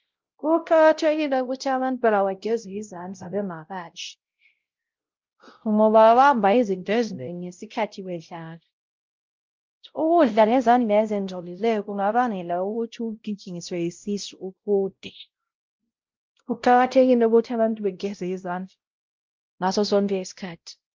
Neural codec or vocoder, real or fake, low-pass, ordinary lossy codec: codec, 16 kHz, 0.5 kbps, X-Codec, WavLM features, trained on Multilingual LibriSpeech; fake; 7.2 kHz; Opus, 24 kbps